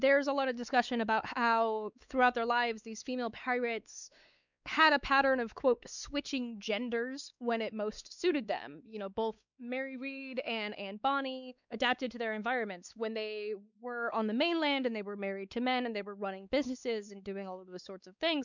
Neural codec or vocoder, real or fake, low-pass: codec, 16 kHz, 4 kbps, X-Codec, WavLM features, trained on Multilingual LibriSpeech; fake; 7.2 kHz